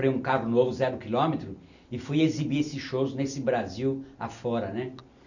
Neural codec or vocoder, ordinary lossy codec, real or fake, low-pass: none; none; real; 7.2 kHz